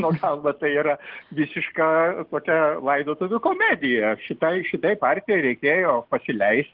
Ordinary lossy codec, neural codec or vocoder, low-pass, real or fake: Opus, 16 kbps; none; 5.4 kHz; real